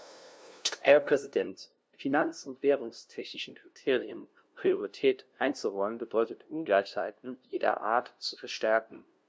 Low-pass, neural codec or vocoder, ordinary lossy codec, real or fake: none; codec, 16 kHz, 0.5 kbps, FunCodec, trained on LibriTTS, 25 frames a second; none; fake